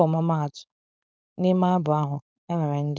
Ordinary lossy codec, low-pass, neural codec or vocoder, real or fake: none; none; codec, 16 kHz, 4.8 kbps, FACodec; fake